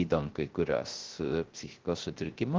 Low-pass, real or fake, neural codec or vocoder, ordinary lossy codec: 7.2 kHz; fake; codec, 16 kHz, 0.3 kbps, FocalCodec; Opus, 16 kbps